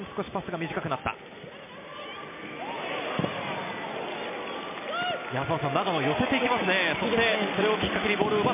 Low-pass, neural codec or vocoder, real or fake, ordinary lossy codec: 3.6 kHz; none; real; MP3, 24 kbps